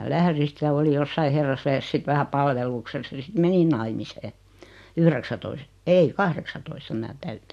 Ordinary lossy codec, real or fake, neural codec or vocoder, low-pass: MP3, 64 kbps; real; none; 14.4 kHz